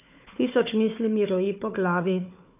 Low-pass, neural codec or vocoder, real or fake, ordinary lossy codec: 3.6 kHz; codec, 16 kHz, 4 kbps, FunCodec, trained on Chinese and English, 50 frames a second; fake; none